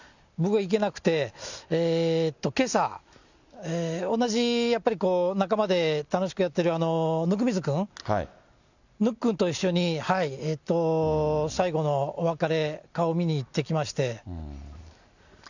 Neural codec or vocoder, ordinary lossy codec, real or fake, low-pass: none; none; real; 7.2 kHz